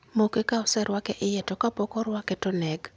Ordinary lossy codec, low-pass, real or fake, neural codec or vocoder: none; none; real; none